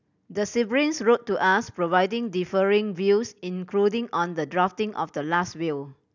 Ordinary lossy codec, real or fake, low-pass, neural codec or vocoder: none; real; 7.2 kHz; none